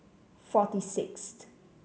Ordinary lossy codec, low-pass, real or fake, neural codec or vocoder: none; none; real; none